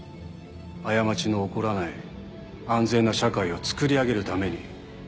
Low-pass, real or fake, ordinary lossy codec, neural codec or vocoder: none; real; none; none